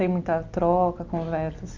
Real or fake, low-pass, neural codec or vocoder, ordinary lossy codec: real; 7.2 kHz; none; Opus, 24 kbps